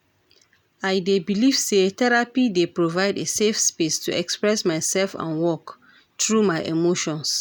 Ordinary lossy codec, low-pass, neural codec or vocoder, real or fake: none; none; none; real